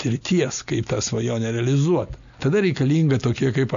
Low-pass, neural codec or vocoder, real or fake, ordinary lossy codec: 7.2 kHz; none; real; AAC, 96 kbps